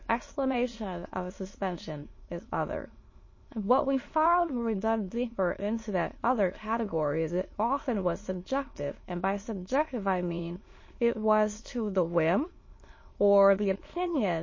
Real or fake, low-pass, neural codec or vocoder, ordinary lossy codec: fake; 7.2 kHz; autoencoder, 22.05 kHz, a latent of 192 numbers a frame, VITS, trained on many speakers; MP3, 32 kbps